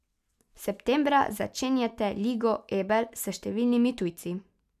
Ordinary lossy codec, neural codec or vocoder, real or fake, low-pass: none; none; real; 14.4 kHz